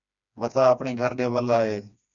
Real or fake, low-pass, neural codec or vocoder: fake; 7.2 kHz; codec, 16 kHz, 2 kbps, FreqCodec, smaller model